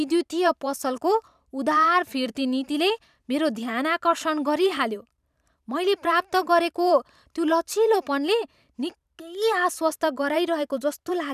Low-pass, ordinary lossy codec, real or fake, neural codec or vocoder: 14.4 kHz; none; fake; vocoder, 44.1 kHz, 128 mel bands every 512 samples, BigVGAN v2